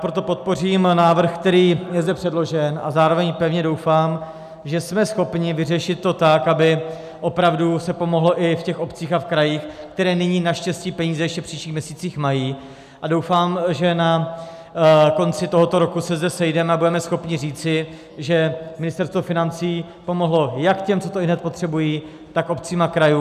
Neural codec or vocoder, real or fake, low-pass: none; real; 14.4 kHz